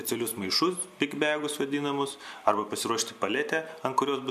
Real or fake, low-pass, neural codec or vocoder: real; 14.4 kHz; none